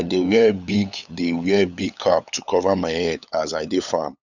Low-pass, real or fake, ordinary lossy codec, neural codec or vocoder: 7.2 kHz; fake; none; codec, 16 kHz, 8 kbps, FunCodec, trained on LibriTTS, 25 frames a second